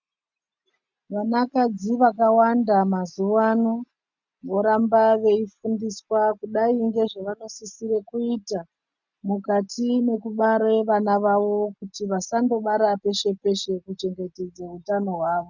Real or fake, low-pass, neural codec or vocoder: real; 7.2 kHz; none